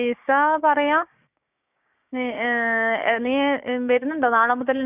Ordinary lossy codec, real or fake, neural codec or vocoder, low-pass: none; fake; codec, 16 kHz, 6 kbps, DAC; 3.6 kHz